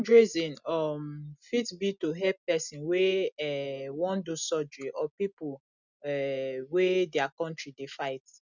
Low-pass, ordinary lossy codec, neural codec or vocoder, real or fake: 7.2 kHz; none; none; real